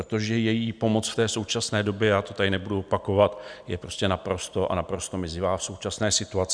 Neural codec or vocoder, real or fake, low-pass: none; real; 9.9 kHz